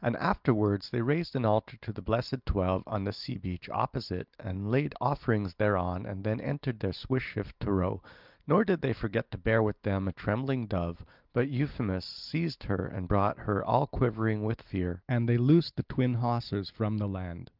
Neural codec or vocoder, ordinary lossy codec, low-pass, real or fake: none; Opus, 24 kbps; 5.4 kHz; real